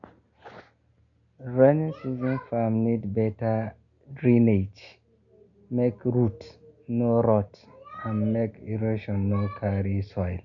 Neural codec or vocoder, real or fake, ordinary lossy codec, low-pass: none; real; none; 7.2 kHz